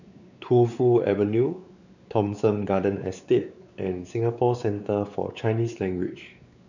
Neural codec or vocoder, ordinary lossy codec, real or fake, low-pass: codec, 16 kHz, 4 kbps, X-Codec, WavLM features, trained on Multilingual LibriSpeech; none; fake; 7.2 kHz